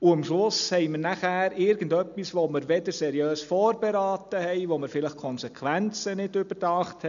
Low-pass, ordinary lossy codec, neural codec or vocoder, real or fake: 7.2 kHz; none; none; real